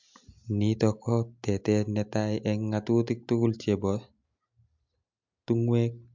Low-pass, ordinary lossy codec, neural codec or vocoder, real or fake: 7.2 kHz; MP3, 64 kbps; none; real